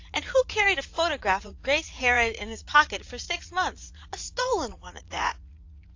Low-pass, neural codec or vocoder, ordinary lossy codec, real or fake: 7.2 kHz; vocoder, 44.1 kHz, 80 mel bands, Vocos; AAC, 48 kbps; fake